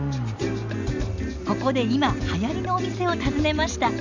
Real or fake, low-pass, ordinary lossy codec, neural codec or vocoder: real; 7.2 kHz; none; none